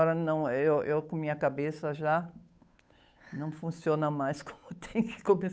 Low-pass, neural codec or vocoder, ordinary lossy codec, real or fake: none; codec, 16 kHz, 8 kbps, FunCodec, trained on Chinese and English, 25 frames a second; none; fake